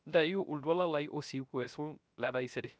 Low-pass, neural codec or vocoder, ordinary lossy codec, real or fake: none; codec, 16 kHz, 0.3 kbps, FocalCodec; none; fake